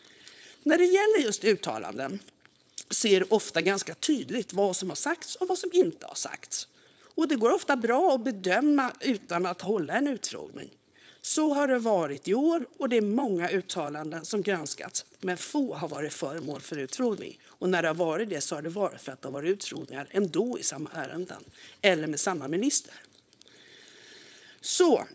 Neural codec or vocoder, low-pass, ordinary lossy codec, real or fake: codec, 16 kHz, 4.8 kbps, FACodec; none; none; fake